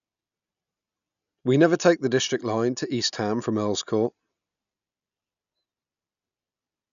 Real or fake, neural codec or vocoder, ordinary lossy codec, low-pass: real; none; none; 7.2 kHz